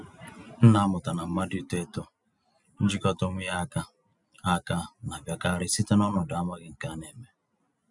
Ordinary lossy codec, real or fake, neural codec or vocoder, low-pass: none; real; none; 10.8 kHz